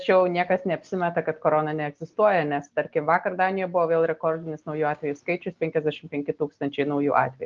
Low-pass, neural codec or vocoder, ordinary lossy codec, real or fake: 7.2 kHz; none; Opus, 32 kbps; real